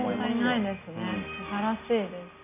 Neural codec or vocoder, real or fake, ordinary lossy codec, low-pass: none; real; none; 3.6 kHz